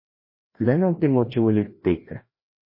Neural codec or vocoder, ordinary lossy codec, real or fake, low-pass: codec, 16 kHz, 1 kbps, FreqCodec, larger model; MP3, 24 kbps; fake; 5.4 kHz